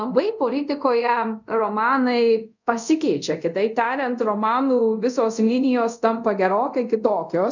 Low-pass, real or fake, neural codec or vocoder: 7.2 kHz; fake; codec, 24 kHz, 0.5 kbps, DualCodec